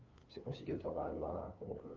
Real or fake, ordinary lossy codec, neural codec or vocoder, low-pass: fake; Opus, 24 kbps; codec, 16 kHz, 2 kbps, FunCodec, trained on LibriTTS, 25 frames a second; 7.2 kHz